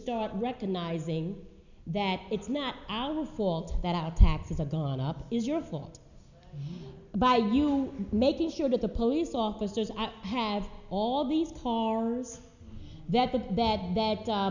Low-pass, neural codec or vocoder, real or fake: 7.2 kHz; none; real